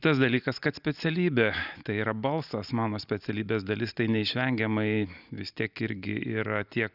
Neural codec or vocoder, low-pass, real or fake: none; 5.4 kHz; real